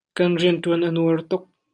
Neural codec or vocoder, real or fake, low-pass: none; real; 10.8 kHz